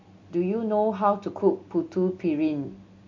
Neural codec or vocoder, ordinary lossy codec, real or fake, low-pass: none; MP3, 48 kbps; real; 7.2 kHz